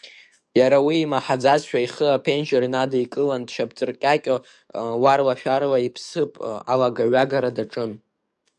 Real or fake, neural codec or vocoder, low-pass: fake; codec, 44.1 kHz, 7.8 kbps, DAC; 10.8 kHz